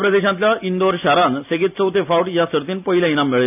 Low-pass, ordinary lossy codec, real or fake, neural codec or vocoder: 3.6 kHz; none; real; none